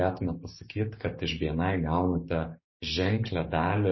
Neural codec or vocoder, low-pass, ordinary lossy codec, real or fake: codec, 16 kHz, 6 kbps, DAC; 7.2 kHz; MP3, 24 kbps; fake